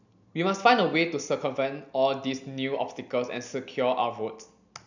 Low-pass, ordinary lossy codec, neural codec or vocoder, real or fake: 7.2 kHz; none; none; real